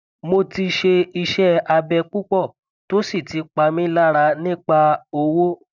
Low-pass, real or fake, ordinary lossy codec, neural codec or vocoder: 7.2 kHz; real; none; none